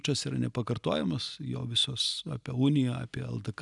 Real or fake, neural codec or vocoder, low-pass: real; none; 10.8 kHz